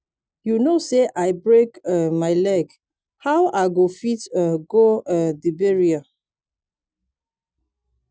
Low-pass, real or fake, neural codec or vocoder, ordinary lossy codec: none; real; none; none